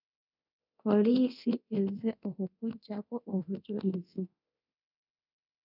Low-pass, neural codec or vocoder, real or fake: 5.4 kHz; codec, 24 kHz, 0.9 kbps, DualCodec; fake